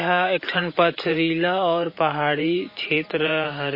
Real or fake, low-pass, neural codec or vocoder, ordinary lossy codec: fake; 5.4 kHz; vocoder, 44.1 kHz, 128 mel bands every 512 samples, BigVGAN v2; MP3, 24 kbps